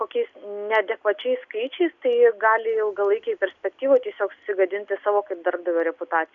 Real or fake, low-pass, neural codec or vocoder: real; 7.2 kHz; none